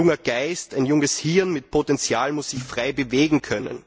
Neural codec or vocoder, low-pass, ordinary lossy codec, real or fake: none; none; none; real